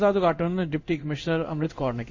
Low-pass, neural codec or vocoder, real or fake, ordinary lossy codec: 7.2 kHz; codec, 16 kHz in and 24 kHz out, 1 kbps, XY-Tokenizer; fake; MP3, 64 kbps